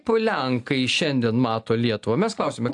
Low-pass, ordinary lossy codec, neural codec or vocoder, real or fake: 10.8 kHz; AAC, 64 kbps; none; real